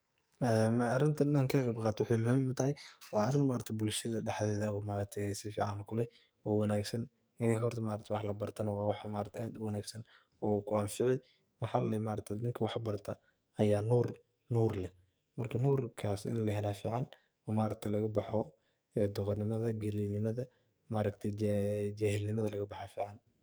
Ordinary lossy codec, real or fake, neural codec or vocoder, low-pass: none; fake; codec, 44.1 kHz, 2.6 kbps, SNAC; none